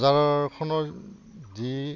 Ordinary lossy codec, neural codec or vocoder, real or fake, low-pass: none; none; real; 7.2 kHz